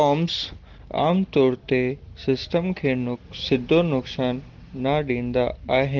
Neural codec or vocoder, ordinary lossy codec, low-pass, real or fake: none; Opus, 16 kbps; 7.2 kHz; real